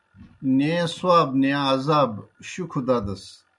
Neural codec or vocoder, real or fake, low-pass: none; real; 10.8 kHz